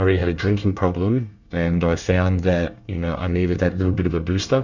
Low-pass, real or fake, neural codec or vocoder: 7.2 kHz; fake; codec, 24 kHz, 1 kbps, SNAC